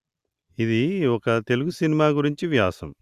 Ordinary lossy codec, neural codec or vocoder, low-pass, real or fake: Opus, 64 kbps; none; 14.4 kHz; real